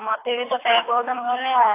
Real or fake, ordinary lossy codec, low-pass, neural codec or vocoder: fake; AAC, 16 kbps; 3.6 kHz; codec, 24 kHz, 3 kbps, HILCodec